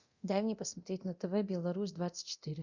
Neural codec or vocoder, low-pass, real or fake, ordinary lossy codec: codec, 24 kHz, 0.9 kbps, DualCodec; 7.2 kHz; fake; Opus, 64 kbps